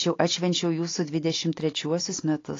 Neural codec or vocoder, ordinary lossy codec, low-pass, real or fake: none; AAC, 32 kbps; 7.2 kHz; real